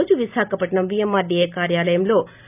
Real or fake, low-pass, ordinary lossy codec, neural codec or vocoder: real; 3.6 kHz; none; none